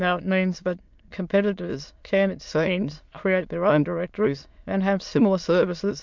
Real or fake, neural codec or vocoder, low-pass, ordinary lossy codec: fake; autoencoder, 22.05 kHz, a latent of 192 numbers a frame, VITS, trained on many speakers; 7.2 kHz; MP3, 64 kbps